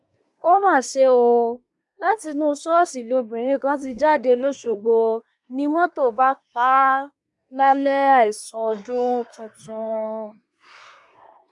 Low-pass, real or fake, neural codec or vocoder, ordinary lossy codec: 10.8 kHz; fake; codec, 24 kHz, 1 kbps, SNAC; none